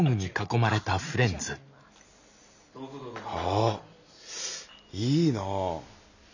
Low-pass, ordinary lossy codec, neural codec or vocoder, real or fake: 7.2 kHz; none; none; real